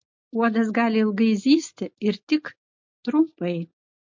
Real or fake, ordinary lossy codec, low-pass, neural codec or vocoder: real; MP3, 48 kbps; 7.2 kHz; none